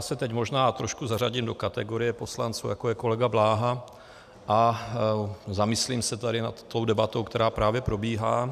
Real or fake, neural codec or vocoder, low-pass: real; none; 14.4 kHz